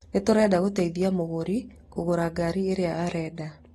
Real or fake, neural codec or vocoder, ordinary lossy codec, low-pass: real; none; AAC, 32 kbps; 19.8 kHz